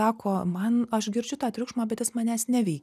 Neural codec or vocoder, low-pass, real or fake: none; 14.4 kHz; real